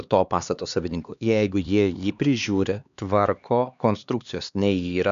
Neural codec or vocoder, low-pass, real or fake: codec, 16 kHz, 2 kbps, X-Codec, HuBERT features, trained on LibriSpeech; 7.2 kHz; fake